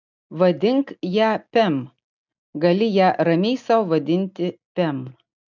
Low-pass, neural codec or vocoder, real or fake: 7.2 kHz; none; real